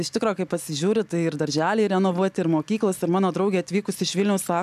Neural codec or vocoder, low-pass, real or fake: none; 14.4 kHz; real